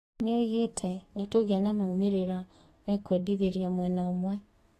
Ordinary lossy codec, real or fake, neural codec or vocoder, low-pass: AAC, 48 kbps; fake; codec, 32 kHz, 1.9 kbps, SNAC; 14.4 kHz